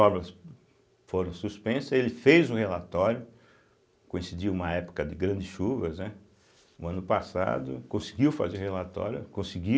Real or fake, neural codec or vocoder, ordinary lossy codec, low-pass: real; none; none; none